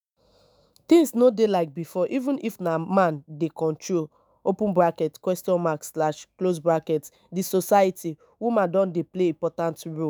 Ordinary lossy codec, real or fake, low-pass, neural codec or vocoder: none; fake; none; autoencoder, 48 kHz, 128 numbers a frame, DAC-VAE, trained on Japanese speech